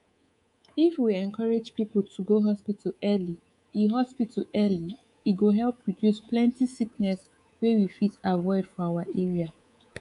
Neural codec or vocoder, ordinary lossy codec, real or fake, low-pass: codec, 24 kHz, 3.1 kbps, DualCodec; none; fake; 10.8 kHz